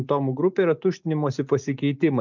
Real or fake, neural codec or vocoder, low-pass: real; none; 7.2 kHz